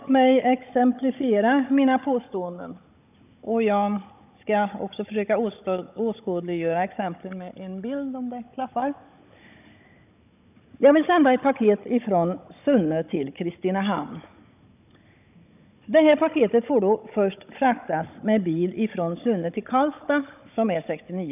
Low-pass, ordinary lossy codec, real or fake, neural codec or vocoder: 3.6 kHz; none; fake; codec, 16 kHz, 16 kbps, FunCodec, trained on Chinese and English, 50 frames a second